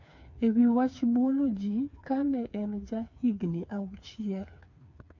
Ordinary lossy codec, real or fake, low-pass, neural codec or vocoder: MP3, 48 kbps; fake; 7.2 kHz; codec, 16 kHz, 4 kbps, FreqCodec, smaller model